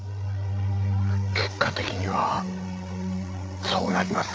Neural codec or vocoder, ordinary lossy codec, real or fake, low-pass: codec, 16 kHz, 8 kbps, FreqCodec, larger model; none; fake; none